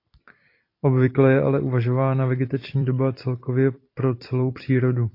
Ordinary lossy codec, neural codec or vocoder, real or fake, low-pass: AAC, 32 kbps; vocoder, 44.1 kHz, 128 mel bands every 256 samples, BigVGAN v2; fake; 5.4 kHz